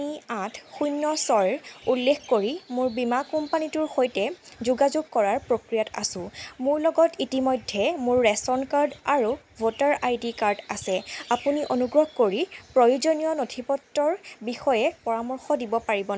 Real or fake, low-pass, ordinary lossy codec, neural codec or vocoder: real; none; none; none